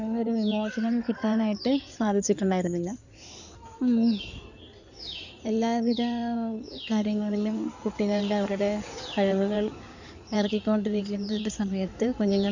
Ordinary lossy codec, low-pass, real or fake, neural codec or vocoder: none; 7.2 kHz; fake; codec, 16 kHz in and 24 kHz out, 2.2 kbps, FireRedTTS-2 codec